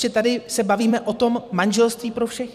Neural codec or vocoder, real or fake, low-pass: vocoder, 44.1 kHz, 128 mel bands every 256 samples, BigVGAN v2; fake; 14.4 kHz